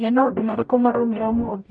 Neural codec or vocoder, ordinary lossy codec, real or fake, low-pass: codec, 44.1 kHz, 0.9 kbps, DAC; none; fake; 9.9 kHz